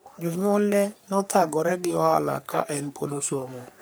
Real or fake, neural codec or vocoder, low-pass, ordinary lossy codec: fake; codec, 44.1 kHz, 3.4 kbps, Pupu-Codec; none; none